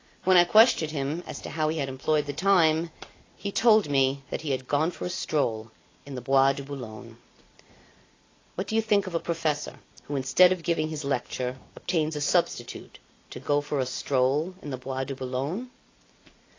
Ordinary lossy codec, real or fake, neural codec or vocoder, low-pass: AAC, 32 kbps; real; none; 7.2 kHz